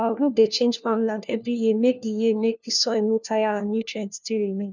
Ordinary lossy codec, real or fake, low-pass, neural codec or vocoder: none; fake; 7.2 kHz; codec, 16 kHz, 1 kbps, FunCodec, trained on LibriTTS, 50 frames a second